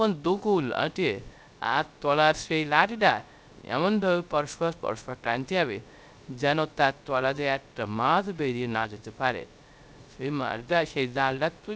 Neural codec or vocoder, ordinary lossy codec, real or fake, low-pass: codec, 16 kHz, 0.3 kbps, FocalCodec; none; fake; none